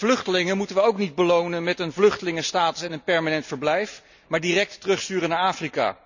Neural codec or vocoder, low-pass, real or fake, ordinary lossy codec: none; 7.2 kHz; real; none